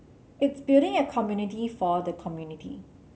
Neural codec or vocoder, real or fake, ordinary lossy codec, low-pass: none; real; none; none